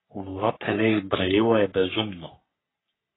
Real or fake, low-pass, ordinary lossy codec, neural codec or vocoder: fake; 7.2 kHz; AAC, 16 kbps; codec, 44.1 kHz, 3.4 kbps, Pupu-Codec